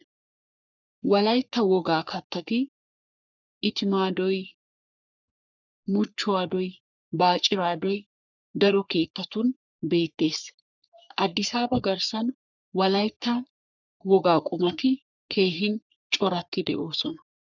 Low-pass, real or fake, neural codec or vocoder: 7.2 kHz; fake; codec, 44.1 kHz, 3.4 kbps, Pupu-Codec